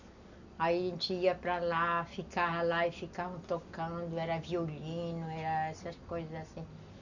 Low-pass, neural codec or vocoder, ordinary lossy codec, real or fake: 7.2 kHz; none; none; real